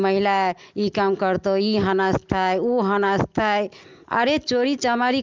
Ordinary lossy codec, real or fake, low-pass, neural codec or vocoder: Opus, 24 kbps; real; 7.2 kHz; none